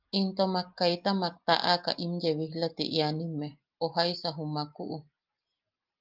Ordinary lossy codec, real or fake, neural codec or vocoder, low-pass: Opus, 24 kbps; real; none; 5.4 kHz